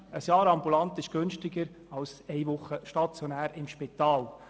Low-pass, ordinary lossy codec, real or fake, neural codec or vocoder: none; none; real; none